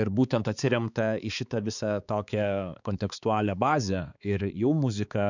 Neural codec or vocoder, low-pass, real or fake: codec, 16 kHz, 4 kbps, X-Codec, HuBERT features, trained on balanced general audio; 7.2 kHz; fake